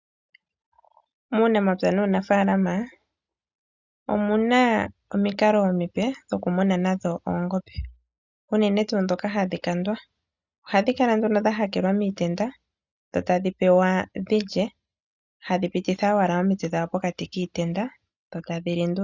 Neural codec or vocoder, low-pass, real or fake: none; 7.2 kHz; real